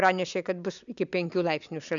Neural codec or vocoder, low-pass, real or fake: none; 7.2 kHz; real